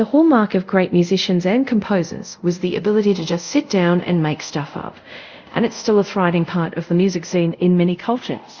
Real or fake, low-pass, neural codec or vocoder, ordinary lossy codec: fake; 7.2 kHz; codec, 24 kHz, 0.5 kbps, DualCodec; Opus, 64 kbps